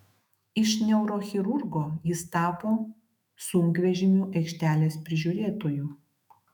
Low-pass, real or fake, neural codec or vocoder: 19.8 kHz; fake; autoencoder, 48 kHz, 128 numbers a frame, DAC-VAE, trained on Japanese speech